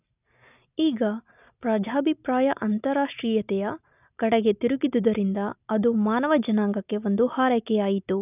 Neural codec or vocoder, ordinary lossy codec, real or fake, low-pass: none; none; real; 3.6 kHz